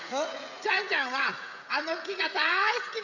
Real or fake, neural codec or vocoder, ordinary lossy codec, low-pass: fake; codec, 16 kHz, 16 kbps, FreqCodec, smaller model; none; 7.2 kHz